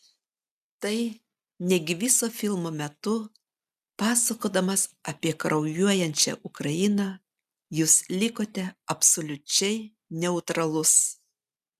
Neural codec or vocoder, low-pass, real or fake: none; 14.4 kHz; real